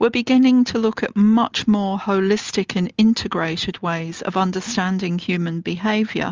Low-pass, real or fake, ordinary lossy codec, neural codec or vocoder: 7.2 kHz; real; Opus, 32 kbps; none